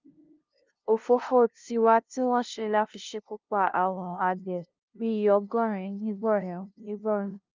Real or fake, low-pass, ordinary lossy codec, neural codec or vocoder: fake; 7.2 kHz; Opus, 24 kbps; codec, 16 kHz, 0.5 kbps, FunCodec, trained on LibriTTS, 25 frames a second